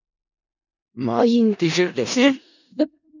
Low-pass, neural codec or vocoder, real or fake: 7.2 kHz; codec, 16 kHz in and 24 kHz out, 0.4 kbps, LongCat-Audio-Codec, four codebook decoder; fake